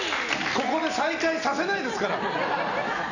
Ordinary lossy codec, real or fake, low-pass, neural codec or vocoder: none; real; 7.2 kHz; none